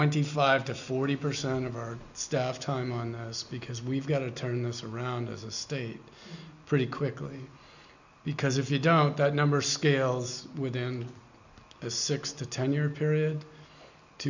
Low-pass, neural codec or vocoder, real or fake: 7.2 kHz; none; real